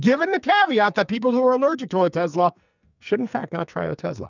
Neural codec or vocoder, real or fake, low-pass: codec, 44.1 kHz, 2.6 kbps, SNAC; fake; 7.2 kHz